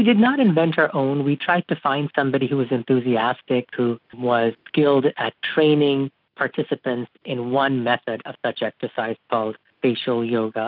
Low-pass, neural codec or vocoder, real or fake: 5.4 kHz; none; real